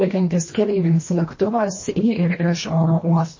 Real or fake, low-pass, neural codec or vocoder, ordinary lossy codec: fake; 7.2 kHz; codec, 24 kHz, 1.5 kbps, HILCodec; MP3, 32 kbps